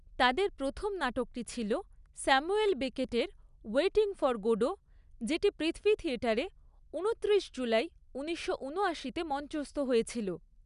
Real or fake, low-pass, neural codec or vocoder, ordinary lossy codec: real; 10.8 kHz; none; none